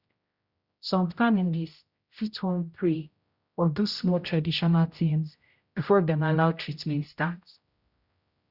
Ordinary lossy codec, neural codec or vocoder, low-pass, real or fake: Opus, 64 kbps; codec, 16 kHz, 0.5 kbps, X-Codec, HuBERT features, trained on general audio; 5.4 kHz; fake